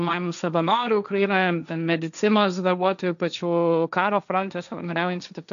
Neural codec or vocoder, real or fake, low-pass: codec, 16 kHz, 1.1 kbps, Voila-Tokenizer; fake; 7.2 kHz